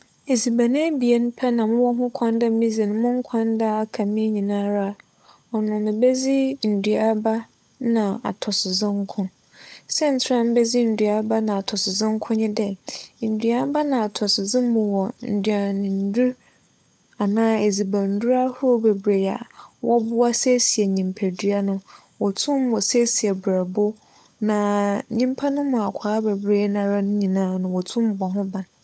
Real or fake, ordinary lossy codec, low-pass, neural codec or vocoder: fake; none; none; codec, 16 kHz, 16 kbps, FunCodec, trained on LibriTTS, 50 frames a second